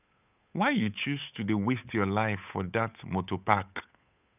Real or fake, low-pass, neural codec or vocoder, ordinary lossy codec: fake; 3.6 kHz; codec, 16 kHz, 8 kbps, FunCodec, trained on Chinese and English, 25 frames a second; none